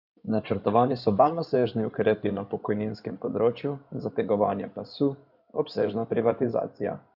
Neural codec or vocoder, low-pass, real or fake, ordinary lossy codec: codec, 16 kHz in and 24 kHz out, 2.2 kbps, FireRedTTS-2 codec; 5.4 kHz; fake; none